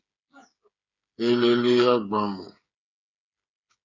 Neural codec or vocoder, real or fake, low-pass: codec, 16 kHz, 8 kbps, FreqCodec, smaller model; fake; 7.2 kHz